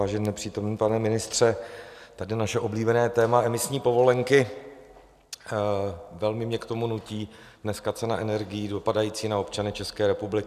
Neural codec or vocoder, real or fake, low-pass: none; real; 14.4 kHz